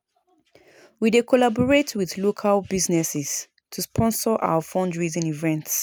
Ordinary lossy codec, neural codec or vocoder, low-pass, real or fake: none; none; none; real